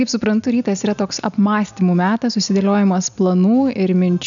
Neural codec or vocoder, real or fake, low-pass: none; real; 7.2 kHz